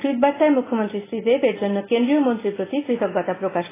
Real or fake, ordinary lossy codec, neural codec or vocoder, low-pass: real; AAC, 16 kbps; none; 3.6 kHz